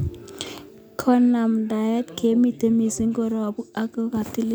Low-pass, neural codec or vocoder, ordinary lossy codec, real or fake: none; none; none; real